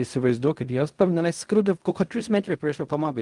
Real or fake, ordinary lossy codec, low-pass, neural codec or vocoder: fake; Opus, 32 kbps; 10.8 kHz; codec, 16 kHz in and 24 kHz out, 0.4 kbps, LongCat-Audio-Codec, fine tuned four codebook decoder